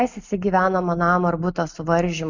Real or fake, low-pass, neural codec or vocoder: real; 7.2 kHz; none